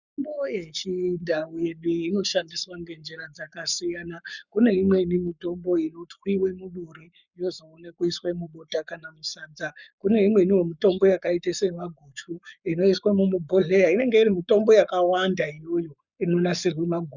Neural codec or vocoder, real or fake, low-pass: codec, 44.1 kHz, 7.8 kbps, Pupu-Codec; fake; 7.2 kHz